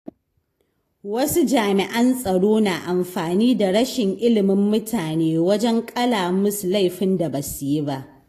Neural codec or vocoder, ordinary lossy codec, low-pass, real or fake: none; AAC, 48 kbps; 14.4 kHz; real